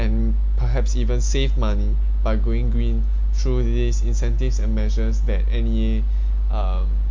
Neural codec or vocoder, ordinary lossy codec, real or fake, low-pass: none; MP3, 48 kbps; real; 7.2 kHz